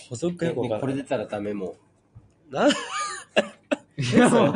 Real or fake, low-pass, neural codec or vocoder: real; 9.9 kHz; none